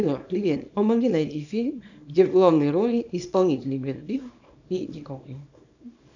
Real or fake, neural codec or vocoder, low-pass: fake; codec, 24 kHz, 0.9 kbps, WavTokenizer, small release; 7.2 kHz